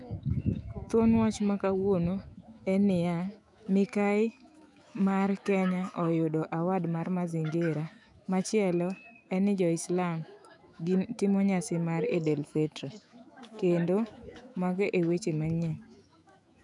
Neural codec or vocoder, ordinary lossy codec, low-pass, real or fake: autoencoder, 48 kHz, 128 numbers a frame, DAC-VAE, trained on Japanese speech; none; 10.8 kHz; fake